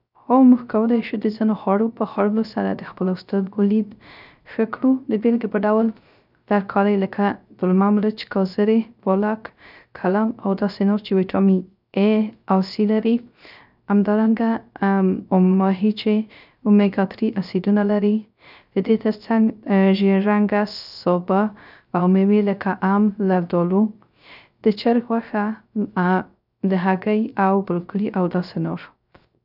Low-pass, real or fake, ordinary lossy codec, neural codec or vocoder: 5.4 kHz; fake; none; codec, 16 kHz, 0.3 kbps, FocalCodec